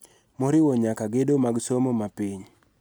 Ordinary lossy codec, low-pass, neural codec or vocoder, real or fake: none; none; none; real